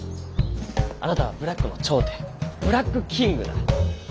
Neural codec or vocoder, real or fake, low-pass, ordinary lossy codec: none; real; none; none